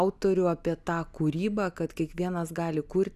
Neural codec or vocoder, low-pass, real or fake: none; 14.4 kHz; real